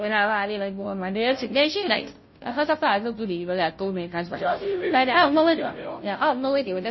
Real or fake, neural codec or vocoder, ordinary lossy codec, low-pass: fake; codec, 16 kHz, 0.5 kbps, FunCodec, trained on Chinese and English, 25 frames a second; MP3, 24 kbps; 7.2 kHz